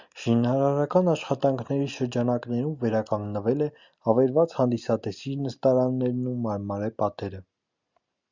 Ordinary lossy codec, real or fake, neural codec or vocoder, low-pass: Opus, 64 kbps; real; none; 7.2 kHz